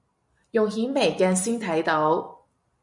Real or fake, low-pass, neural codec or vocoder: fake; 10.8 kHz; vocoder, 44.1 kHz, 128 mel bands every 256 samples, BigVGAN v2